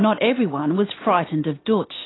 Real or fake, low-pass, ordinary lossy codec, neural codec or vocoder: real; 7.2 kHz; AAC, 16 kbps; none